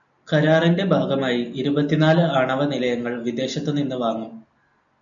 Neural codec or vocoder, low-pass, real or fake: none; 7.2 kHz; real